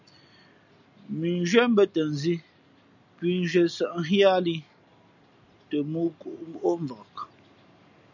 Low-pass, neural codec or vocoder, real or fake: 7.2 kHz; none; real